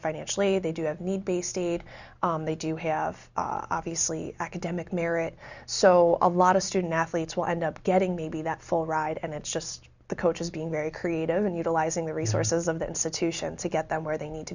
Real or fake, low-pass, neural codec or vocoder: real; 7.2 kHz; none